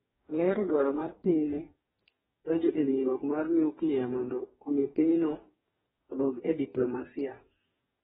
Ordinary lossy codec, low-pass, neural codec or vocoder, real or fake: AAC, 16 kbps; 19.8 kHz; codec, 44.1 kHz, 2.6 kbps, DAC; fake